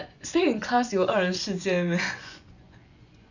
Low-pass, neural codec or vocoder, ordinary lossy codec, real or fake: 7.2 kHz; vocoder, 24 kHz, 100 mel bands, Vocos; none; fake